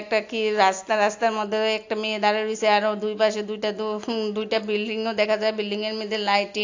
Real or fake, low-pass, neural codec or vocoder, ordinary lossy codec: real; 7.2 kHz; none; AAC, 48 kbps